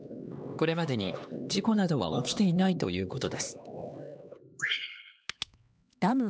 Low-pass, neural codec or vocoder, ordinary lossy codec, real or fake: none; codec, 16 kHz, 2 kbps, X-Codec, HuBERT features, trained on LibriSpeech; none; fake